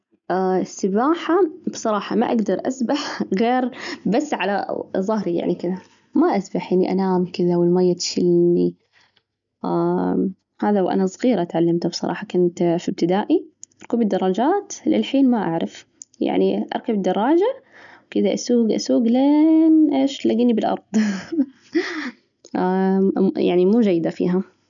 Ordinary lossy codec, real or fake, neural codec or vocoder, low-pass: none; real; none; 7.2 kHz